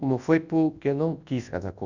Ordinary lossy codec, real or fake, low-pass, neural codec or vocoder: Opus, 64 kbps; fake; 7.2 kHz; codec, 24 kHz, 0.9 kbps, WavTokenizer, large speech release